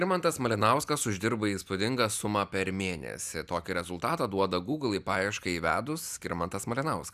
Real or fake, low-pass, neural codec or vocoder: real; 14.4 kHz; none